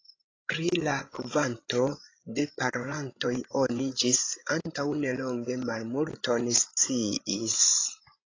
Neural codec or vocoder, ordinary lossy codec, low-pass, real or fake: codec, 16 kHz, 16 kbps, FreqCodec, larger model; AAC, 32 kbps; 7.2 kHz; fake